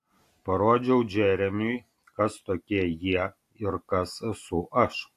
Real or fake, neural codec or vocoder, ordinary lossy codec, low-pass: real; none; AAC, 64 kbps; 14.4 kHz